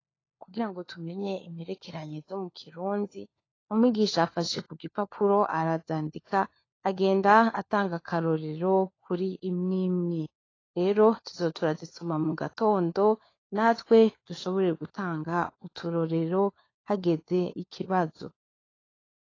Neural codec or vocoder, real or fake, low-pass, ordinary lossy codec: codec, 16 kHz, 4 kbps, FunCodec, trained on LibriTTS, 50 frames a second; fake; 7.2 kHz; AAC, 32 kbps